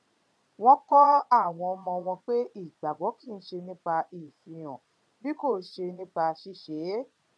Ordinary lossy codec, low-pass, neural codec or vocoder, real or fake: none; none; vocoder, 22.05 kHz, 80 mel bands, Vocos; fake